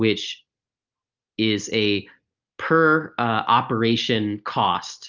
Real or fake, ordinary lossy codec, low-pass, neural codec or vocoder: real; Opus, 24 kbps; 7.2 kHz; none